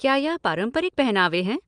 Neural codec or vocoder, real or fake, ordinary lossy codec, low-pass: none; real; Opus, 32 kbps; 9.9 kHz